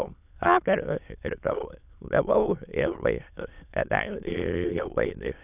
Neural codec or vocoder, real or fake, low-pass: autoencoder, 22.05 kHz, a latent of 192 numbers a frame, VITS, trained on many speakers; fake; 3.6 kHz